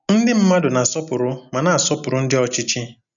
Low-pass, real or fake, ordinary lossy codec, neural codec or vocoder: 7.2 kHz; real; none; none